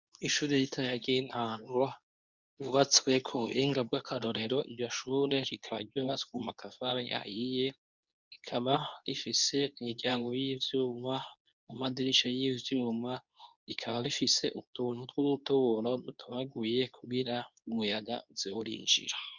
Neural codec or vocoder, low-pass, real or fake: codec, 24 kHz, 0.9 kbps, WavTokenizer, medium speech release version 2; 7.2 kHz; fake